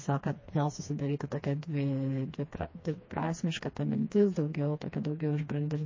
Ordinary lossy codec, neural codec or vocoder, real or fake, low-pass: MP3, 32 kbps; codec, 16 kHz, 2 kbps, FreqCodec, smaller model; fake; 7.2 kHz